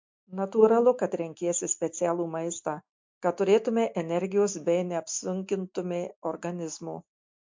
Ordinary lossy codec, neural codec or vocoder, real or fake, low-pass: MP3, 48 kbps; none; real; 7.2 kHz